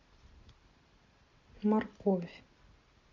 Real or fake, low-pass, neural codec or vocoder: real; 7.2 kHz; none